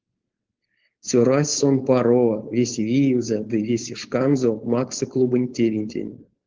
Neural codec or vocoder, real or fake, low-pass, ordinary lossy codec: codec, 16 kHz, 4.8 kbps, FACodec; fake; 7.2 kHz; Opus, 16 kbps